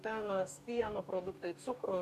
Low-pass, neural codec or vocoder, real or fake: 14.4 kHz; codec, 44.1 kHz, 2.6 kbps, DAC; fake